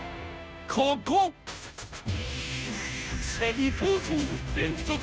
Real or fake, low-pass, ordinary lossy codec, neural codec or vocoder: fake; none; none; codec, 16 kHz, 0.5 kbps, FunCodec, trained on Chinese and English, 25 frames a second